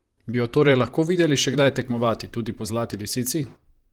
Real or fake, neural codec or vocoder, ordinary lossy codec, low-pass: fake; vocoder, 44.1 kHz, 128 mel bands, Pupu-Vocoder; Opus, 24 kbps; 19.8 kHz